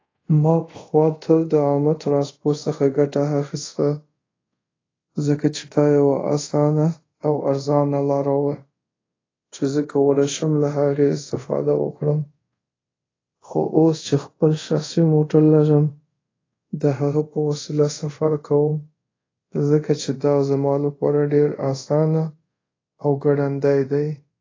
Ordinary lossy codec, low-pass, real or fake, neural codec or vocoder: AAC, 32 kbps; 7.2 kHz; fake; codec, 24 kHz, 0.5 kbps, DualCodec